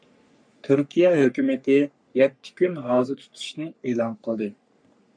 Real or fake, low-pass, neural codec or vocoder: fake; 9.9 kHz; codec, 44.1 kHz, 3.4 kbps, Pupu-Codec